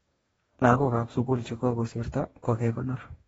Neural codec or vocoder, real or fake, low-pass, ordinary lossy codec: codec, 44.1 kHz, 2.6 kbps, DAC; fake; 19.8 kHz; AAC, 24 kbps